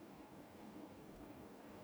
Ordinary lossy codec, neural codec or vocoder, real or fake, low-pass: none; codec, 44.1 kHz, 2.6 kbps, DAC; fake; none